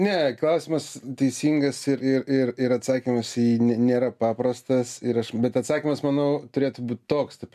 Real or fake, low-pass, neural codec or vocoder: real; 14.4 kHz; none